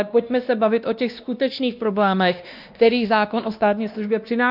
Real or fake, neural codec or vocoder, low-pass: fake; codec, 16 kHz, 1 kbps, X-Codec, WavLM features, trained on Multilingual LibriSpeech; 5.4 kHz